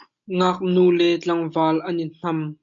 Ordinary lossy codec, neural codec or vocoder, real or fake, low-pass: AAC, 64 kbps; none; real; 7.2 kHz